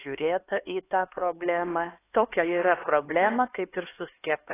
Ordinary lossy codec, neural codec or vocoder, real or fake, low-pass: AAC, 16 kbps; codec, 16 kHz, 2 kbps, X-Codec, HuBERT features, trained on LibriSpeech; fake; 3.6 kHz